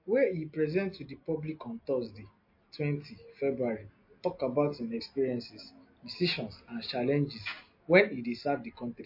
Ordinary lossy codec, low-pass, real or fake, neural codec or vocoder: MP3, 32 kbps; 5.4 kHz; real; none